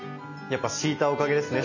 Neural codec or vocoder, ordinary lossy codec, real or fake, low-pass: none; none; real; 7.2 kHz